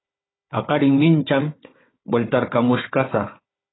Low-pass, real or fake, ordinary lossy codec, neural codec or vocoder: 7.2 kHz; fake; AAC, 16 kbps; codec, 16 kHz, 4 kbps, FunCodec, trained on Chinese and English, 50 frames a second